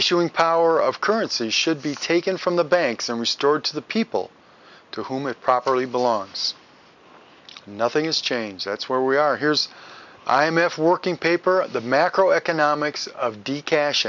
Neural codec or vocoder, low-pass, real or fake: none; 7.2 kHz; real